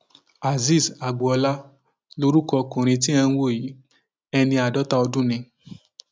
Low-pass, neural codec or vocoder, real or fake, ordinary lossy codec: none; none; real; none